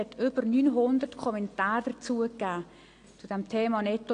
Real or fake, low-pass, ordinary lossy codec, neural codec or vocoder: real; 9.9 kHz; AAC, 48 kbps; none